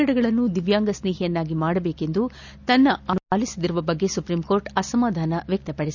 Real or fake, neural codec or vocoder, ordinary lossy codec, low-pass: real; none; none; 7.2 kHz